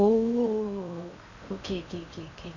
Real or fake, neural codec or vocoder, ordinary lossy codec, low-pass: fake; codec, 16 kHz in and 24 kHz out, 0.8 kbps, FocalCodec, streaming, 65536 codes; none; 7.2 kHz